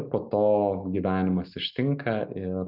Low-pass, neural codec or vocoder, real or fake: 5.4 kHz; none; real